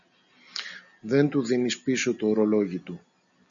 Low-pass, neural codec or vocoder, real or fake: 7.2 kHz; none; real